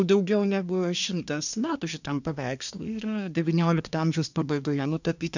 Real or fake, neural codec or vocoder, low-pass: fake; codec, 24 kHz, 1 kbps, SNAC; 7.2 kHz